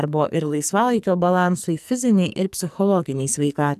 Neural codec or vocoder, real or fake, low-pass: codec, 44.1 kHz, 2.6 kbps, SNAC; fake; 14.4 kHz